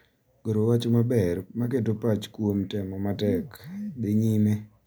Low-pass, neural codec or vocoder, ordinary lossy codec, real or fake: none; none; none; real